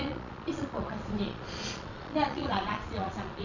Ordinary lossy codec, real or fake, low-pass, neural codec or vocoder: none; fake; 7.2 kHz; vocoder, 22.05 kHz, 80 mel bands, Vocos